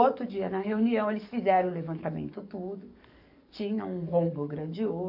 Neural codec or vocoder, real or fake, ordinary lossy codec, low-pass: codec, 44.1 kHz, 7.8 kbps, DAC; fake; none; 5.4 kHz